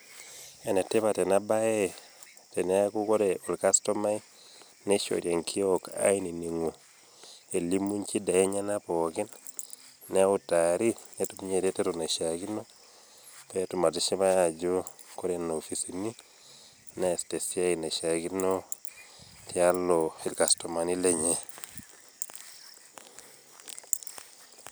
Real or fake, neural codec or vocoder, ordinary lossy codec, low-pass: real; none; none; none